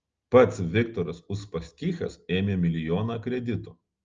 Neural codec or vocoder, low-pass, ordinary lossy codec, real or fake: none; 7.2 kHz; Opus, 24 kbps; real